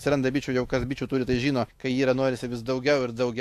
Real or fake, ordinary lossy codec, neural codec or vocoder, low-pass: fake; AAC, 64 kbps; autoencoder, 48 kHz, 128 numbers a frame, DAC-VAE, trained on Japanese speech; 14.4 kHz